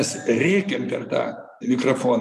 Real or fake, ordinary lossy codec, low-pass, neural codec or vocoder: real; MP3, 96 kbps; 14.4 kHz; none